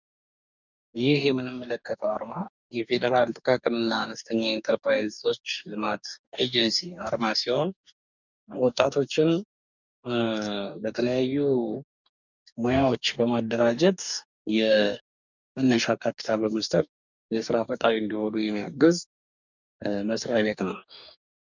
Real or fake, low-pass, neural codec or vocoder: fake; 7.2 kHz; codec, 44.1 kHz, 2.6 kbps, DAC